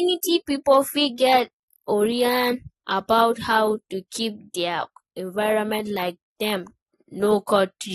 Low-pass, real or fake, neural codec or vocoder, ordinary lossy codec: 19.8 kHz; real; none; AAC, 32 kbps